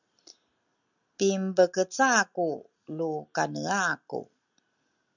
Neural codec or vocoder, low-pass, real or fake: none; 7.2 kHz; real